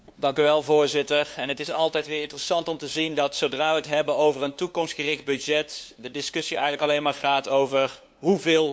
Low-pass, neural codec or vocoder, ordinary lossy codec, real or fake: none; codec, 16 kHz, 2 kbps, FunCodec, trained on LibriTTS, 25 frames a second; none; fake